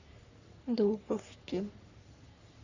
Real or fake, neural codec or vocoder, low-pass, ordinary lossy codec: fake; codec, 44.1 kHz, 3.4 kbps, Pupu-Codec; 7.2 kHz; Opus, 64 kbps